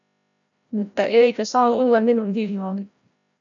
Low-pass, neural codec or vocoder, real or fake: 7.2 kHz; codec, 16 kHz, 0.5 kbps, FreqCodec, larger model; fake